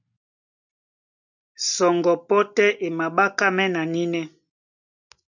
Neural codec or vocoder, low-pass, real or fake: none; 7.2 kHz; real